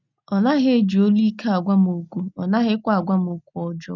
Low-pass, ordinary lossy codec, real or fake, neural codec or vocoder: 7.2 kHz; none; real; none